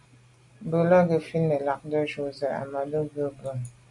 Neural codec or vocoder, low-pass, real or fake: none; 10.8 kHz; real